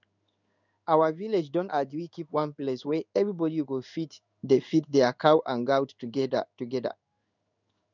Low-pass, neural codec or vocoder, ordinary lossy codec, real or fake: 7.2 kHz; codec, 16 kHz in and 24 kHz out, 1 kbps, XY-Tokenizer; none; fake